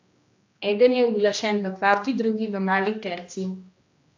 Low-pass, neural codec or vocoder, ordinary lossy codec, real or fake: 7.2 kHz; codec, 16 kHz, 1 kbps, X-Codec, HuBERT features, trained on general audio; AAC, 48 kbps; fake